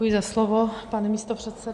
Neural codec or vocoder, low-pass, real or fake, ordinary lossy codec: none; 10.8 kHz; real; Opus, 64 kbps